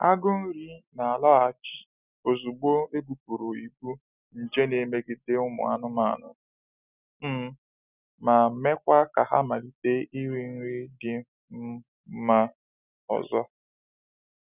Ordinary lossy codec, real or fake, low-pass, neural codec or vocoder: none; real; 3.6 kHz; none